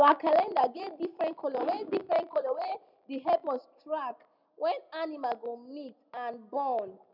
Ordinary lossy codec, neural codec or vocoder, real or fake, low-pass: none; none; real; 5.4 kHz